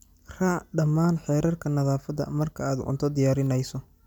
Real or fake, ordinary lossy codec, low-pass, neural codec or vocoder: real; none; 19.8 kHz; none